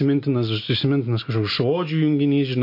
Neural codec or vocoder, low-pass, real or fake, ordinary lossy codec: none; 5.4 kHz; real; MP3, 32 kbps